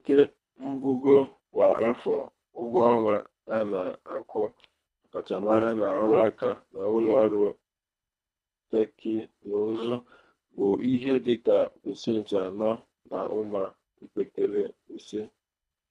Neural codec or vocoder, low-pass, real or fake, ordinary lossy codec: codec, 24 kHz, 1.5 kbps, HILCodec; none; fake; none